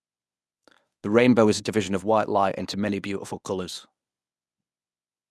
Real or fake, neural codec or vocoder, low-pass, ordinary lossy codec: fake; codec, 24 kHz, 0.9 kbps, WavTokenizer, medium speech release version 1; none; none